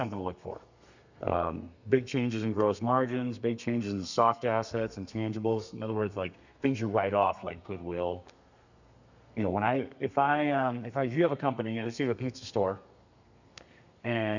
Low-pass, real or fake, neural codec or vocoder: 7.2 kHz; fake; codec, 32 kHz, 1.9 kbps, SNAC